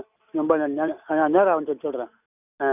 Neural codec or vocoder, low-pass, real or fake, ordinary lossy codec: none; 3.6 kHz; real; none